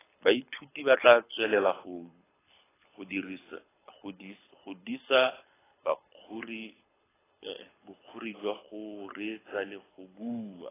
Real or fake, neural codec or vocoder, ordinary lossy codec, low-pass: fake; codec, 24 kHz, 6 kbps, HILCodec; AAC, 16 kbps; 3.6 kHz